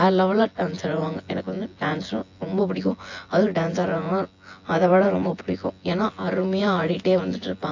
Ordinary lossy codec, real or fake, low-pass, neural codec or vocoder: AAC, 48 kbps; fake; 7.2 kHz; vocoder, 24 kHz, 100 mel bands, Vocos